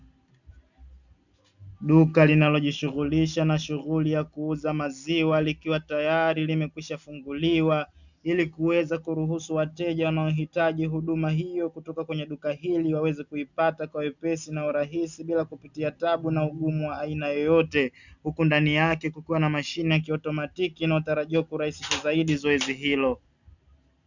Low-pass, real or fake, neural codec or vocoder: 7.2 kHz; real; none